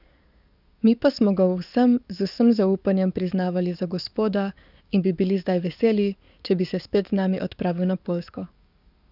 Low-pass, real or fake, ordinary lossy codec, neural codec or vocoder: 5.4 kHz; fake; none; codec, 44.1 kHz, 7.8 kbps, DAC